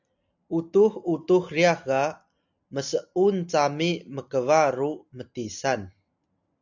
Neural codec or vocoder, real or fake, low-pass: none; real; 7.2 kHz